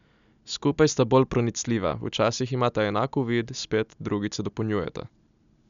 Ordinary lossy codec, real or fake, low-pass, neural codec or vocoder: none; real; 7.2 kHz; none